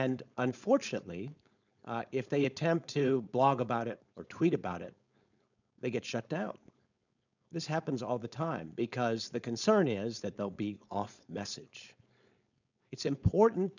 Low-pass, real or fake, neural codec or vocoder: 7.2 kHz; fake; codec, 16 kHz, 4.8 kbps, FACodec